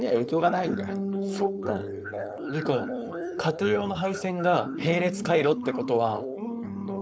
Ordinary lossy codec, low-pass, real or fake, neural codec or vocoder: none; none; fake; codec, 16 kHz, 4.8 kbps, FACodec